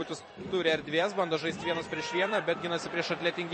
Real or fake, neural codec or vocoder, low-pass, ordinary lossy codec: fake; vocoder, 44.1 kHz, 128 mel bands every 256 samples, BigVGAN v2; 9.9 kHz; MP3, 32 kbps